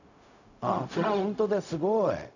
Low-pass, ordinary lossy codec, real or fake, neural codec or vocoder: 7.2 kHz; none; fake; codec, 16 kHz, 0.4 kbps, LongCat-Audio-Codec